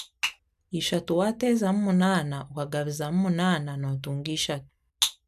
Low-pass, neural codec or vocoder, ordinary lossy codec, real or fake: 14.4 kHz; none; none; real